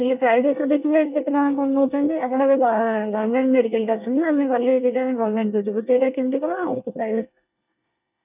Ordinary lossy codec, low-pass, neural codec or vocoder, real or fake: none; 3.6 kHz; codec, 24 kHz, 1 kbps, SNAC; fake